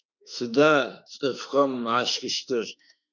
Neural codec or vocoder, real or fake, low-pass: autoencoder, 48 kHz, 32 numbers a frame, DAC-VAE, trained on Japanese speech; fake; 7.2 kHz